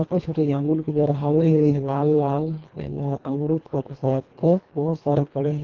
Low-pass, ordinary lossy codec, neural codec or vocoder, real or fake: 7.2 kHz; Opus, 32 kbps; codec, 24 kHz, 1.5 kbps, HILCodec; fake